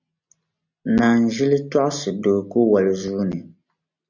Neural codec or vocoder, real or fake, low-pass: none; real; 7.2 kHz